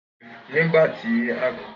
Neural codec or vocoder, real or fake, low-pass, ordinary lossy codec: none; real; 7.2 kHz; none